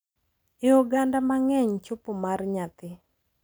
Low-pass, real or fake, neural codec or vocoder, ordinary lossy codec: none; real; none; none